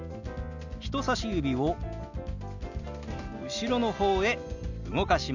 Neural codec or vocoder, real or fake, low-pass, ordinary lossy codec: none; real; 7.2 kHz; none